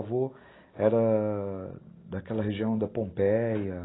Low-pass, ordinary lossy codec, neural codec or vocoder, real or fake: 7.2 kHz; AAC, 16 kbps; none; real